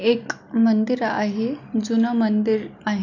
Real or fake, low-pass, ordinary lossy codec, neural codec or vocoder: real; 7.2 kHz; none; none